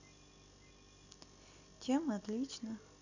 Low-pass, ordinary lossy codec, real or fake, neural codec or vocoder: 7.2 kHz; none; real; none